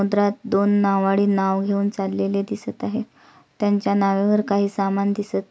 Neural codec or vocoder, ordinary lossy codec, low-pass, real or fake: none; none; none; real